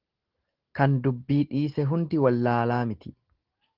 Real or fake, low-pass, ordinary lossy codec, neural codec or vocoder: real; 5.4 kHz; Opus, 16 kbps; none